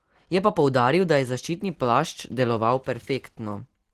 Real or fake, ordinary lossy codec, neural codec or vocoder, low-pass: real; Opus, 16 kbps; none; 14.4 kHz